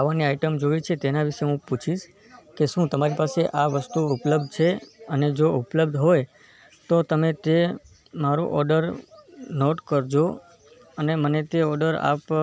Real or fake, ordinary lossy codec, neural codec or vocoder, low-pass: real; none; none; none